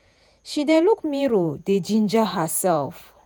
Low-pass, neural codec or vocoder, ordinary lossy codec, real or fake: none; vocoder, 48 kHz, 128 mel bands, Vocos; none; fake